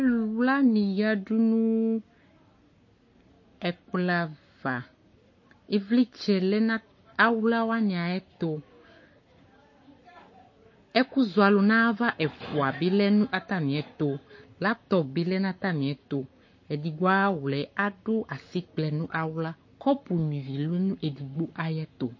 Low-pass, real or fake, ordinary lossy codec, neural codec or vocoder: 7.2 kHz; fake; MP3, 32 kbps; codec, 44.1 kHz, 7.8 kbps, Pupu-Codec